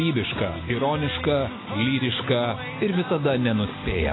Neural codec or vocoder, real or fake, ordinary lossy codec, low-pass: none; real; AAC, 16 kbps; 7.2 kHz